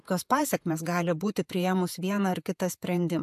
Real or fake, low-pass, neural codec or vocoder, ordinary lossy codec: fake; 14.4 kHz; vocoder, 48 kHz, 128 mel bands, Vocos; AAC, 96 kbps